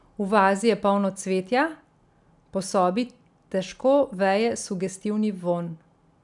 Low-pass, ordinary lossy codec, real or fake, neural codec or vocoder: 10.8 kHz; none; real; none